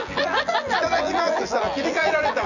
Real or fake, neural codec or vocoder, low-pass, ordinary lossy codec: fake; vocoder, 24 kHz, 100 mel bands, Vocos; 7.2 kHz; none